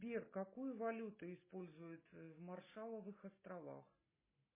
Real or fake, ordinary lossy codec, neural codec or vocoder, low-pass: real; MP3, 16 kbps; none; 3.6 kHz